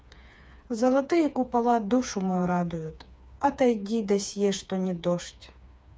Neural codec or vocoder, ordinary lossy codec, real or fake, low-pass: codec, 16 kHz, 4 kbps, FreqCodec, smaller model; none; fake; none